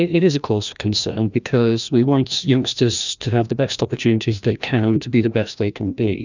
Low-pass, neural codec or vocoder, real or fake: 7.2 kHz; codec, 16 kHz, 1 kbps, FreqCodec, larger model; fake